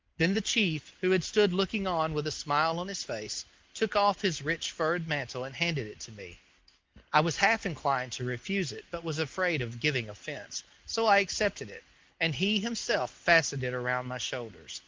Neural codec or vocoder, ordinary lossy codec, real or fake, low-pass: none; Opus, 24 kbps; real; 7.2 kHz